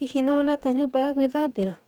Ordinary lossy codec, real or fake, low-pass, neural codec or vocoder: none; fake; 19.8 kHz; codec, 44.1 kHz, 2.6 kbps, DAC